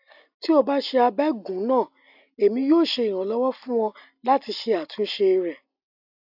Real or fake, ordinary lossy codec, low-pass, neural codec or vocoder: real; AAC, 48 kbps; 5.4 kHz; none